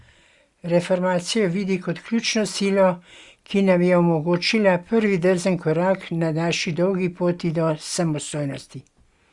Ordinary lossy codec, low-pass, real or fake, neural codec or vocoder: Opus, 64 kbps; 10.8 kHz; real; none